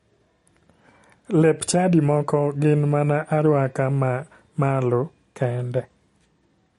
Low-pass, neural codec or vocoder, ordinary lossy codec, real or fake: 19.8 kHz; none; MP3, 48 kbps; real